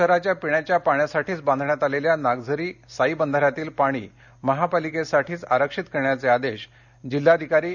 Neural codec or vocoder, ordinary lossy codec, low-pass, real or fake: none; none; 7.2 kHz; real